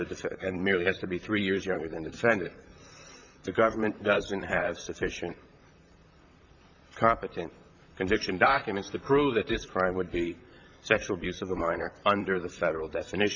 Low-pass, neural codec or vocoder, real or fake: 7.2 kHz; vocoder, 44.1 kHz, 128 mel bands, Pupu-Vocoder; fake